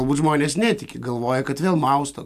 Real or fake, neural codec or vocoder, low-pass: real; none; 14.4 kHz